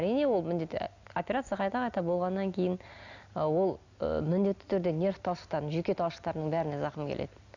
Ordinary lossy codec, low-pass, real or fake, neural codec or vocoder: none; 7.2 kHz; real; none